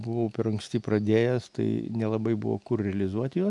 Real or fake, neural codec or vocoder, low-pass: real; none; 10.8 kHz